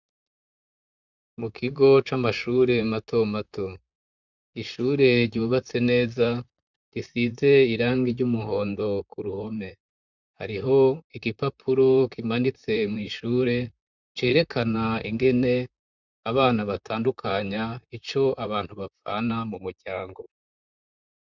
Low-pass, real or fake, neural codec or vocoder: 7.2 kHz; fake; vocoder, 44.1 kHz, 128 mel bands, Pupu-Vocoder